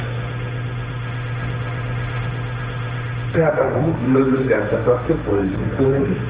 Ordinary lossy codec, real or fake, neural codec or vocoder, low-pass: Opus, 24 kbps; fake; codec, 16 kHz, 1.1 kbps, Voila-Tokenizer; 3.6 kHz